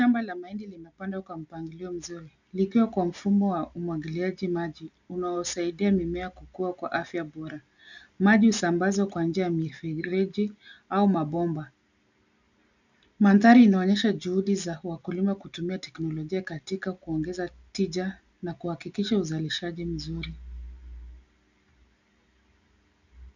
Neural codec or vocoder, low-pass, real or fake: none; 7.2 kHz; real